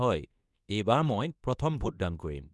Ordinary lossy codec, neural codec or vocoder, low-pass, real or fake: none; codec, 24 kHz, 0.9 kbps, WavTokenizer, medium speech release version 2; none; fake